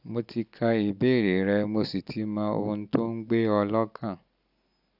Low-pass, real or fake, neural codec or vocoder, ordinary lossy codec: 5.4 kHz; real; none; none